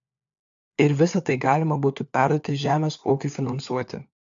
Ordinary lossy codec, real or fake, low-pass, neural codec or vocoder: AAC, 64 kbps; fake; 7.2 kHz; codec, 16 kHz, 4 kbps, FunCodec, trained on LibriTTS, 50 frames a second